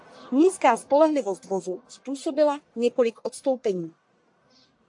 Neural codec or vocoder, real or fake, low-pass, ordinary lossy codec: codec, 44.1 kHz, 1.7 kbps, Pupu-Codec; fake; 10.8 kHz; AAC, 64 kbps